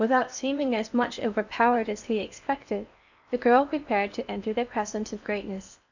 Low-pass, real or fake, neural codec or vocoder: 7.2 kHz; fake; codec, 16 kHz in and 24 kHz out, 0.8 kbps, FocalCodec, streaming, 65536 codes